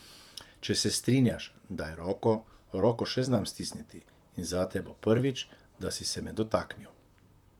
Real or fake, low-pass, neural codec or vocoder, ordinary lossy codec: fake; 19.8 kHz; vocoder, 44.1 kHz, 128 mel bands, Pupu-Vocoder; none